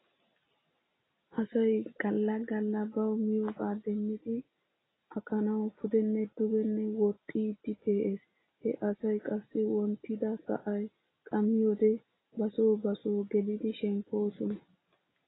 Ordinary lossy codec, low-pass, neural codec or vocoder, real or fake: AAC, 16 kbps; 7.2 kHz; none; real